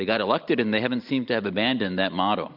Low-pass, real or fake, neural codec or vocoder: 5.4 kHz; real; none